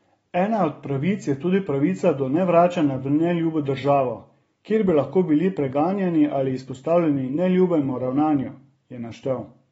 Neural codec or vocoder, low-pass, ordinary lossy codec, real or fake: none; 14.4 kHz; AAC, 24 kbps; real